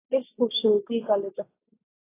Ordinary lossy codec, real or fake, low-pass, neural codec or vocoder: AAC, 16 kbps; real; 3.6 kHz; none